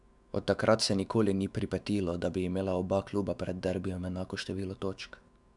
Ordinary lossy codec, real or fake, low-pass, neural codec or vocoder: none; fake; 10.8 kHz; autoencoder, 48 kHz, 128 numbers a frame, DAC-VAE, trained on Japanese speech